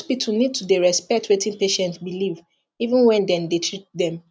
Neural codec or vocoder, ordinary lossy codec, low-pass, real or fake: none; none; none; real